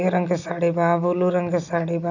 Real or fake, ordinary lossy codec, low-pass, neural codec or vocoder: real; none; 7.2 kHz; none